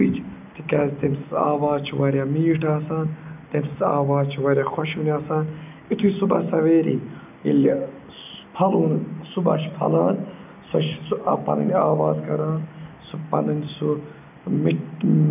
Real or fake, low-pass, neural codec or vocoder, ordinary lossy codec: real; 3.6 kHz; none; none